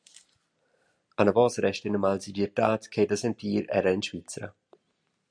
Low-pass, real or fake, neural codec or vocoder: 9.9 kHz; real; none